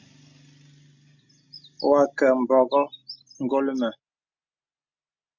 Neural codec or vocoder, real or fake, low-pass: none; real; 7.2 kHz